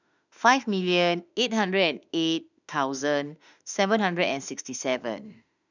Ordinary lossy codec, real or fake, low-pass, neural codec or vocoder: none; fake; 7.2 kHz; autoencoder, 48 kHz, 32 numbers a frame, DAC-VAE, trained on Japanese speech